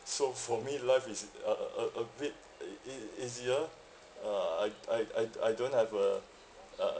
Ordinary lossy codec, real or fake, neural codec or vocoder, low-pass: none; real; none; none